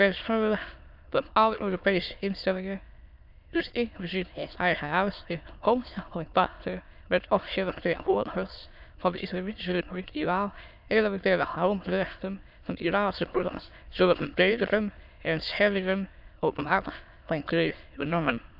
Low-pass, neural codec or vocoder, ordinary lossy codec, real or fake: 5.4 kHz; autoencoder, 22.05 kHz, a latent of 192 numbers a frame, VITS, trained on many speakers; none; fake